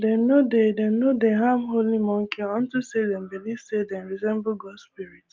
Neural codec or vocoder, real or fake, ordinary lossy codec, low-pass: none; real; Opus, 32 kbps; 7.2 kHz